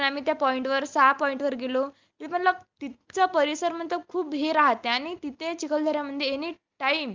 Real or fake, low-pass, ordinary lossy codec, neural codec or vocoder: real; 7.2 kHz; Opus, 24 kbps; none